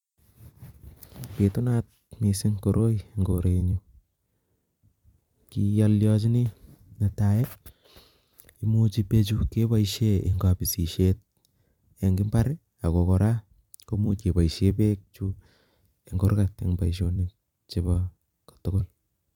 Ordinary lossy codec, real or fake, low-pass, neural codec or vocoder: MP3, 96 kbps; fake; 19.8 kHz; vocoder, 44.1 kHz, 128 mel bands every 256 samples, BigVGAN v2